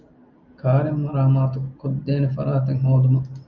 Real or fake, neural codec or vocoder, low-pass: real; none; 7.2 kHz